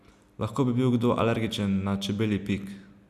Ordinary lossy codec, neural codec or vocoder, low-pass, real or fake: none; none; 14.4 kHz; real